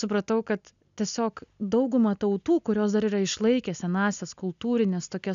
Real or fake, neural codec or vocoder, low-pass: real; none; 7.2 kHz